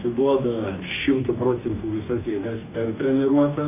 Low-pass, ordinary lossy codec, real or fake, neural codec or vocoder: 3.6 kHz; MP3, 32 kbps; fake; codec, 44.1 kHz, 2.6 kbps, DAC